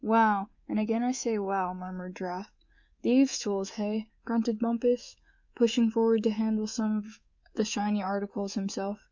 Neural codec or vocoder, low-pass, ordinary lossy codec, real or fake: codec, 24 kHz, 3.1 kbps, DualCodec; 7.2 kHz; Opus, 64 kbps; fake